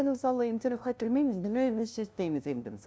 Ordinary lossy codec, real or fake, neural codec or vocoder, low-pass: none; fake; codec, 16 kHz, 0.5 kbps, FunCodec, trained on LibriTTS, 25 frames a second; none